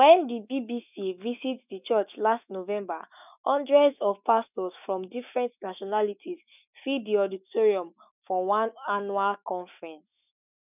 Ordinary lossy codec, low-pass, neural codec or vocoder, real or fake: none; 3.6 kHz; autoencoder, 48 kHz, 128 numbers a frame, DAC-VAE, trained on Japanese speech; fake